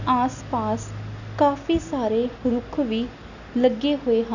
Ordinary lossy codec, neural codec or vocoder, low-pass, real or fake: none; none; 7.2 kHz; real